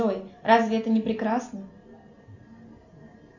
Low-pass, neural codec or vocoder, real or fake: 7.2 kHz; none; real